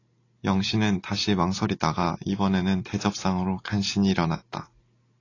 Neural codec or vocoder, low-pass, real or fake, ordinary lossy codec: none; 7.2 kHz; real; AAC, 32 kbps